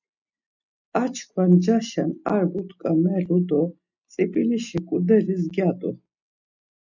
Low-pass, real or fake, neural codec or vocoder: 7.2 kHz; real; none